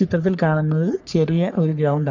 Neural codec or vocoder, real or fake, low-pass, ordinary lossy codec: codec, 44.1 kHz, 3.4 kbps, Pupu-Codec; fake; 7.2 kHz; none